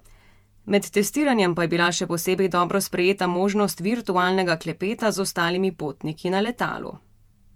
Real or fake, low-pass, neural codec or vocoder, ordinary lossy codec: fake; 19.8 kHz; vocoder, 48 kHz, 128 mel bands, Vocos; MP3, 96 kbps